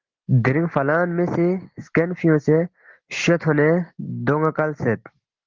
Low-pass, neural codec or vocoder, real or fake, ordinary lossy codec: 7.2 kHz; none; real; Opus, 16 kbps